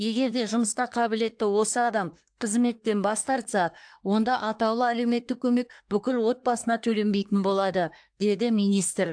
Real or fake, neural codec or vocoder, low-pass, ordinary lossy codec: fake; codec, 24 kHz, 1 kbps, SNAC; 9.9 kHz; AAC, 64 kbps